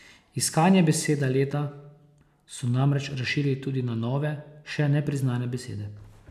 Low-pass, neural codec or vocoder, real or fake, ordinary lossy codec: 14.4 kHz; none; real; none